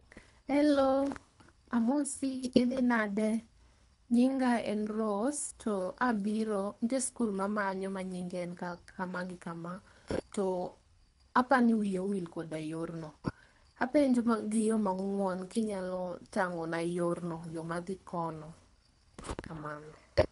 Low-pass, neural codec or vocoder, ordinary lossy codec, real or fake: 10.8 kHz; codec, 24 kHz, 3 kbps, HILCodec; none; fake